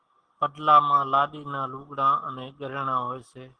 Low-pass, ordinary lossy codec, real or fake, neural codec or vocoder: 9.9 kHz; Opus, 16 kbps; real; none